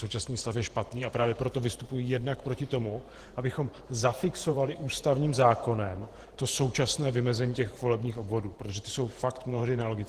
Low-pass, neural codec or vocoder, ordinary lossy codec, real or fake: 14.4 kHz; vocoder, 48 kHz, 128 mel bands, Vocos; Opus, 16 kbps; fake